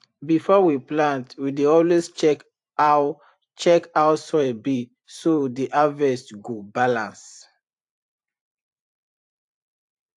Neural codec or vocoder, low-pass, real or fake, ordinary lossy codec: vocoder, 44.1 kHz, 128 mel bands every 512 samples, BigVGAN v2; 10.8 kHz; fake; AAC, 64 kbps